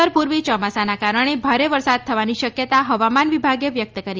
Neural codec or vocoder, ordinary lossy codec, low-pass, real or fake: none; Opus, 24 kbps; 7.2 kHz; real